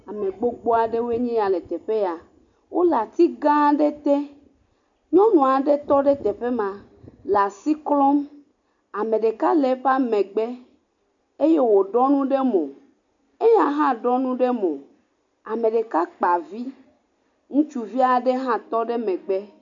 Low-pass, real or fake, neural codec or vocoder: 7.2 kHz; real; none